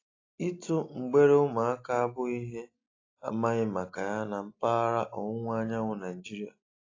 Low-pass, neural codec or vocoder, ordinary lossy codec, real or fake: 7.2 kHz; none; AAC, 32 kbps; real